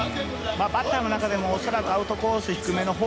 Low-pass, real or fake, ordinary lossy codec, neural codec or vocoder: none; real; none; none